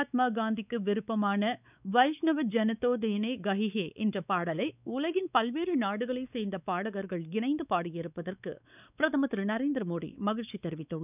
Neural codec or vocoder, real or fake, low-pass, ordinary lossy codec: codec, 16 kHz, 4 kbps, X-Codec, WavLM features, trained on Multilingual LibriSpeech; fake; 3.6 kHz; none